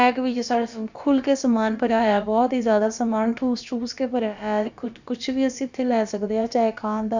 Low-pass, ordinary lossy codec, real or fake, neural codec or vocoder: 7.2 kHz; Opus, 64 kbps; fake; codec, 16 kHz, about 1 kbps, DyCAST, with the encoder's durations